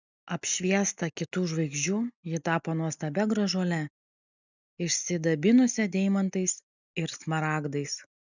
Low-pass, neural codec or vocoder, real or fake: 7.2 kHz; none; real